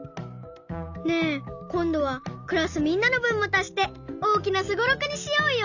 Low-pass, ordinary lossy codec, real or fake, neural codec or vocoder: 7.2 kHz; none; real; none